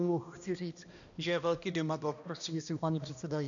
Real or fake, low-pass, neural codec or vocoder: fake; 7.2 kHz; codec, 16 kHz, 1 kbps, X-Codec, HuBERT features, trained on balanced general audio